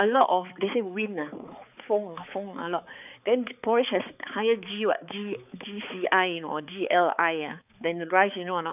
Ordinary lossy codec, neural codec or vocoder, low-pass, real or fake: none; codec, 16 kHz, 4 kbps, X-Codec, HuBERT features, trained on balanced general audio; 3.6 kHz; fake